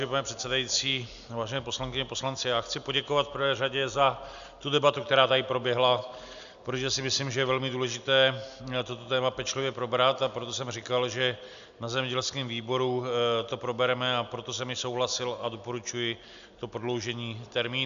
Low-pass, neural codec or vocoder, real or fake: 7.2 kHz; none; real